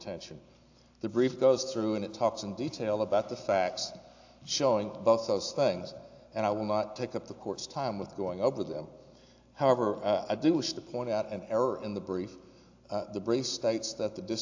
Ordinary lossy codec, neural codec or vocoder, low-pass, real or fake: AAC, 48 kbps; none; 7.2 kHz; real